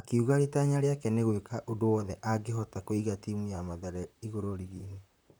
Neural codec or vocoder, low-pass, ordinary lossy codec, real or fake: vocoder, 44.1 kHz, 128 mel bands, Pupu-Vocoder; none; none; fake